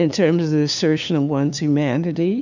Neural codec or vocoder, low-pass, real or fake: codec, 16 kHz, 2 kbps, FunCodec, trained on LibriTTS, 25 frames a second; 7.2 kHz; fake